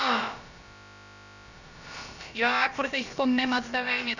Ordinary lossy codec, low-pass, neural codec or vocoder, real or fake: none; 7.2 kHz; codec, 16 kHz, about 1 kbps, DyCAST, with the encoder's durations; fake